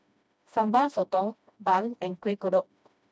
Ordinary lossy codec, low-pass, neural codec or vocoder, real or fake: none; none; codec, 16 kHz, 1 kbps, FreqCodec, smaller model; fake